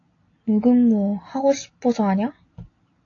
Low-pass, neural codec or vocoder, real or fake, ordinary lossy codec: 7.2 kHz; none; real; AAC, 32 kbps